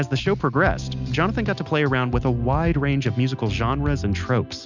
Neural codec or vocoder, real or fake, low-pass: none; real; 7.2 kHz